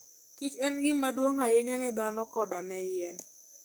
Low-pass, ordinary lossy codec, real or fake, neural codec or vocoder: none; none; fake; codec, 44.1 kHz, 2.6 kbps, SNAC